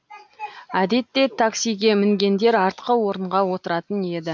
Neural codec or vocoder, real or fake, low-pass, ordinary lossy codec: none; real; none; none